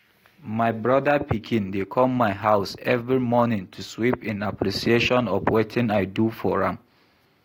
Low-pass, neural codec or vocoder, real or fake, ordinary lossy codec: 19.8 kHz; none; real; AAC, 48 kbps